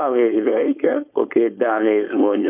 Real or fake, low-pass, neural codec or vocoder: fake; 3.6 kHz; codec, 16 kHz, 4.8 kbps, FACodec